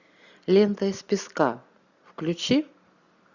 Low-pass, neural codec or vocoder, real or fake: 7.2 kHz; none; real